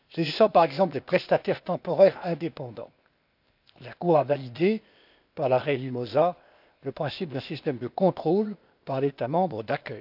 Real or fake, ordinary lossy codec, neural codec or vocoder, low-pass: fake; none; codec, 16 kHz, 0.8 kbps, ZipCodec; 5.4 kHz